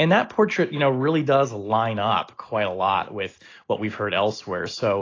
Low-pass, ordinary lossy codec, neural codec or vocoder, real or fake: 7.2 kHz; AAC, 32 kbps; none; real